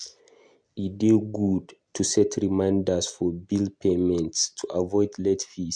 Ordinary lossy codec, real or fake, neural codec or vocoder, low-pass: MP3, 64 kbps; real; none; 9.9 kHz